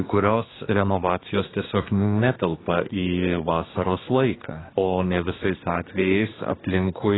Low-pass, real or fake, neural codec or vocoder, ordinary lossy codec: 7.2 kHz; fake; codec, 24 kHz, 1 kbps, SNAC; AAC, 16 kbps